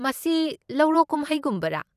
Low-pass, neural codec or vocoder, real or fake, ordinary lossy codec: 14.4 kHz; vocoder, 44.1 kHz, 128 mel bands every 256 samples, BigVGAN v2; fake; none